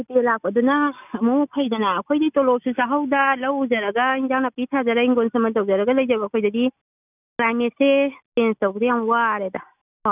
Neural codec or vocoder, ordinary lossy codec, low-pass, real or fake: none; none; 3.6 kHz; real